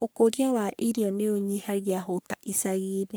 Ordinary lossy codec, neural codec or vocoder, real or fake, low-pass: none; codec, 44.1 kHz, 3.4 kbps, Pupu-Codec; fake; none